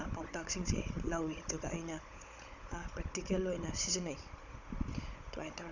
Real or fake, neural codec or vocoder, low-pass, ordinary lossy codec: fake; codec, 16 kHz, 16 kbps, FunCodec, trained on Chinese and English, 50 frames a second; 7.2 kHz; none